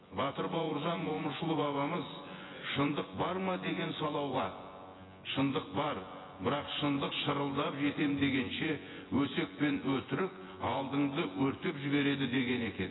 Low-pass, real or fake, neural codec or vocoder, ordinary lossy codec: 7.2 kHz; fake; vocoder, 24 kHz, 100 mel bands, Vocos; AAC, 16 kbps